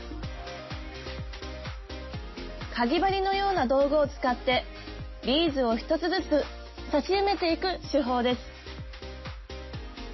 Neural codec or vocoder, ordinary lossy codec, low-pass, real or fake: none; MP3, 24 kbps; 7.2 kHz; real